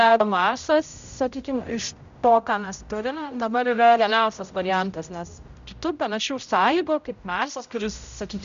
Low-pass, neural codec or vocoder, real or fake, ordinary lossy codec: 7.2 kHz; codec, 16 kHz, 0.5 kbps, X-Codec, HuBERT features, trained on general audio; fake; Opus, 64 kbps